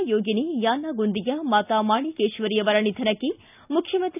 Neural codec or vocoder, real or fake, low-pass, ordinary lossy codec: none; real; 3.6 kHz; none